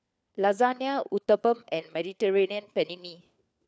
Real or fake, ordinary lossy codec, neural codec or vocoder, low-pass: fake; none; codec, 16 kHz, 4 kbps, FunCodec, trained on LibriTTS, 50 frames a second; none